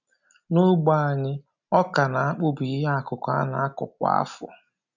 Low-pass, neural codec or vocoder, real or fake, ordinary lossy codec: 7.2 kHz; none; real; none